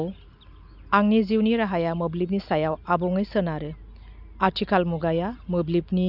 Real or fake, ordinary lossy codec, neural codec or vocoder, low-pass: real; none; none; 5.4 kHz